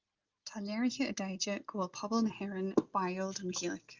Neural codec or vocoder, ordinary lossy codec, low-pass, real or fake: none; Opus, 24 kbps; 7.2 kHz; real